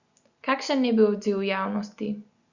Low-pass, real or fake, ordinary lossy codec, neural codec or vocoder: 7.2 kHz; real; Opus, 64 kbps; none